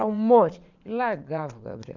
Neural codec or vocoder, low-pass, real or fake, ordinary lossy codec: vocoder, 22.05 kHz, 80 mel bands, Vocos; 7.2 kHz; fake; none